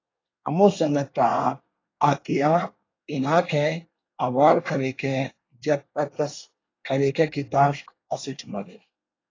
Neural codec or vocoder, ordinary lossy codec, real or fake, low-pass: codec, 24 kHz, 1 kbps, SNAC; AAC, 32 kbps; fake; 7.2 kHz